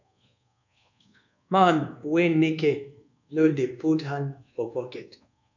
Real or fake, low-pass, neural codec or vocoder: fake; 7.2 kHz; codec, 24 kHz, 1.2 kbps, DualCodec